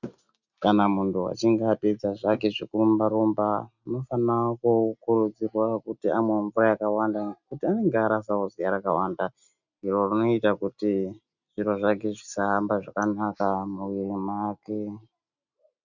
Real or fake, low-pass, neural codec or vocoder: real; 7.2 kHz; none